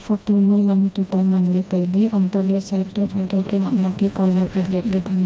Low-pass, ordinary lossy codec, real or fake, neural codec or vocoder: none; none; fake; codec, 16 kHz, 1 kbps, FreqCodec, smaller model